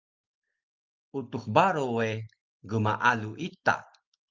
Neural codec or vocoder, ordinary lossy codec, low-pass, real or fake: none; Opus, 32 kbps; 7.2 kHz; real